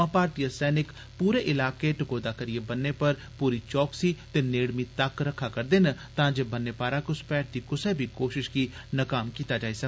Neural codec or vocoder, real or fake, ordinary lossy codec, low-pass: none; real; none; none